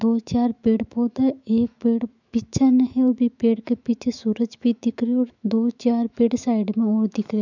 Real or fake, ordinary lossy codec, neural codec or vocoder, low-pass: fake; none; autoencoder, 48 kHz, 128 numbers a frame, DAC-VAE, trained on Japanese speech; 7.2 kHz